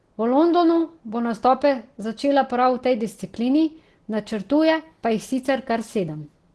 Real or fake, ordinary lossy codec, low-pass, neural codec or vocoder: real; Opus, 16 kbps; 10.8 kHz; none